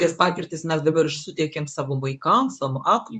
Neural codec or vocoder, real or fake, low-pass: codec, 24 kHz, 0.9 kbps, WavTokenizer, medium speech release version 1; fake; 10.8 kHz